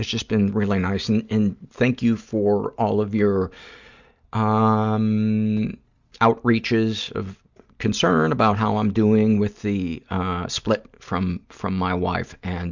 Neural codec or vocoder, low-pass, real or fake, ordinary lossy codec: none; 7.2 kHz; real; Opus, 64 kbps